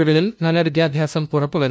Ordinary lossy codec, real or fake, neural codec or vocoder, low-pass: none; fake; codec, 16 kHz, 0.5 kbps, FunCodec, trained on LibriTTS, 25 frames a second; none